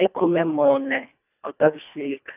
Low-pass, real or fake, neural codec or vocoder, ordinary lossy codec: 3.6 kHz; fake; codec, 24 kHz, 1.5 kbps, HILCodec; none